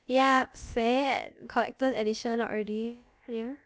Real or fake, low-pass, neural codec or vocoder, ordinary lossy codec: fake; none; codec, 16 kHz, about 1 kbps, DyCAST, with the encoder's durations; none